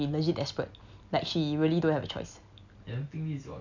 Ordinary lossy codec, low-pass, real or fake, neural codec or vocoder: none; 7.2 kHz; real; none